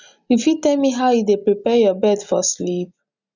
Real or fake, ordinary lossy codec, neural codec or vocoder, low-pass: real; none; none; 7.2 kHz